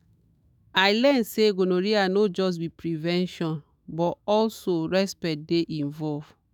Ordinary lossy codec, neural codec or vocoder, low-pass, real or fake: none; autoencoder, 48 kHz, 128 numbers a frame, DAC-VAE, trained on Japanese speech; none; fake